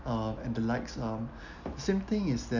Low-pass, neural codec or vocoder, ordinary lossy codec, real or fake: 7.2 kHz; none; none; real